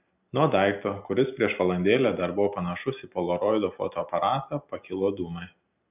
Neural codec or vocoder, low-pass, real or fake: none; 3.6 kHz; real